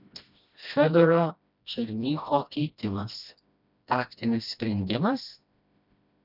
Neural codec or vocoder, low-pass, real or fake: codec, 16 kHz, 1 kbps, FreqCodec, smaller model; 5.4 kHz; fake